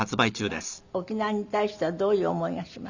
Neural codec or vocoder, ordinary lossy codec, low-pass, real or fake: none; Opus, 64 kbps; 7.2 kHz; real